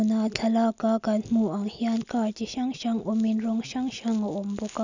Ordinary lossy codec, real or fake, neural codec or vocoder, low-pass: none; real; none; 7.2 kHz